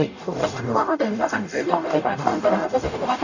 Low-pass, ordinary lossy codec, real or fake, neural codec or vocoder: 7.2 kHz; none; fake; codec, 44.1 kHz, 0.9 kbps, DAC